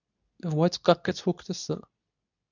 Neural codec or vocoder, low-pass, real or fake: codec, 24 kHz, 0.9 kbps, WavTokenizer, medium speech release version 2; 7.2 kHz; fake